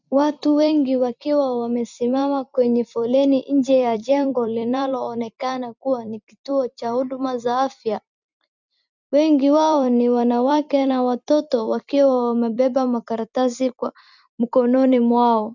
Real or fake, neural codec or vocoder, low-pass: fake; vocoder, 44.1 kHz, 128 mel bands every 256 samples, BigVGAN v2; 7.2 kHz